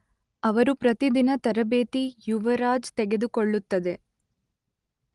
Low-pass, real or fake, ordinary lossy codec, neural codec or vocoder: 10.8 kHz; real; Opus, 24 kbps; none